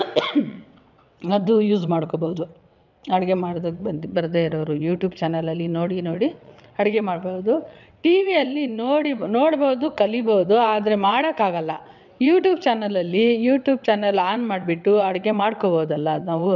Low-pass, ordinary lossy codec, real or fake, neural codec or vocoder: 7.2 kHz; none; fake; vocoder, 22.05 kHz, 80 mel bands, WaveNeXt